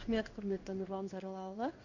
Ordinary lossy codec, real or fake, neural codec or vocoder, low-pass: none; fake; codec, 16 kHz in and 24 kHz out, 1 kbps, XY-Tokenizer; 7.2 kHz